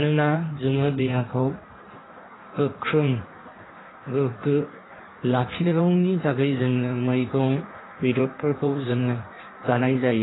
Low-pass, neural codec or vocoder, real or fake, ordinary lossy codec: 7.2 kHz; codec, 16 kHz in and 24 kHz out, 1.1 kbps, FireRedTTS-2 codec; fake; AAC, 16 kbps